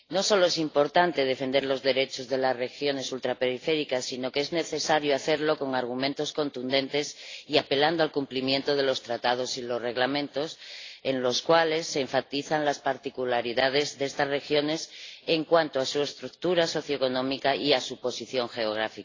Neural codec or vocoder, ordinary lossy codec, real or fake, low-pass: none; AAC, 32 kbps; real; 7.2 kHz